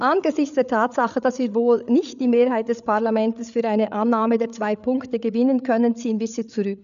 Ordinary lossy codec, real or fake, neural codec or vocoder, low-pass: none; fake; codec, 16 kHz, 8 kbps, FreqCodec, larger model; 7.2 kHz